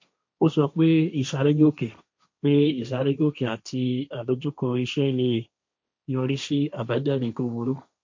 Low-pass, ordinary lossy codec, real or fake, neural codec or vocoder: 7.2 kHz; MP3, 48 kbps; fake; codec, 16 kHz, 1.1 kbps, Voila-Tokenizer